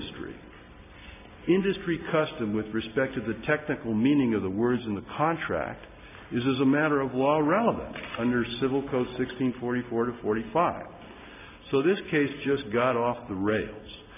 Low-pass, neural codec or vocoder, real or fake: 3.6 kHz; none; real